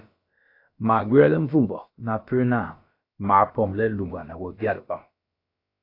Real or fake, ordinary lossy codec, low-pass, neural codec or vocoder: fake; AAC, 32 kbps; 5.4 kHz; codec, 16 kHz, about 1 kbps, DyCAST, with the encoder's durations